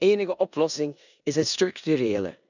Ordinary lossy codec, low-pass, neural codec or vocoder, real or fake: none; 7.2 kHz; codec, 16 kHz in and 24 kHz out, 0.9 kbps, LongCat-Audio-Codec, four codebook decoder; fake